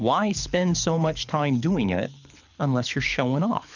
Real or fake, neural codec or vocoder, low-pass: fake; codec, 24 kHz, 6 kbps, HILCodec; 7.2 kHz